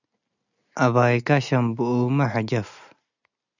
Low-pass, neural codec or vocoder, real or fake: 7.2 kHz; none; real